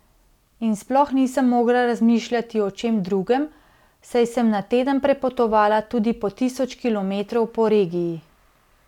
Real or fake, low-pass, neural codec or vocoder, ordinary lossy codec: real; 19.8 kHz; none; none